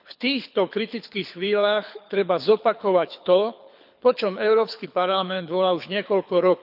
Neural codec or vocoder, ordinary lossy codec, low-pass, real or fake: codec, 24 kHz, 6 kbps, HILCodec; none; 5.4 kHz; fake